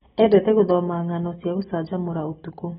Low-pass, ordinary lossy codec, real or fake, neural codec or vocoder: 19.8 kHz; AAC, 16 kbps; real; none